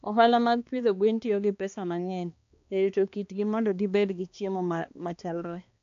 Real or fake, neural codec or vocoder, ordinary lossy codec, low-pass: fake; codec, 16 kHz, 2 kbps, X-Codec, HuBERT features, trained on balanced general audio; AAC, 48 kbps; 7.2 kHz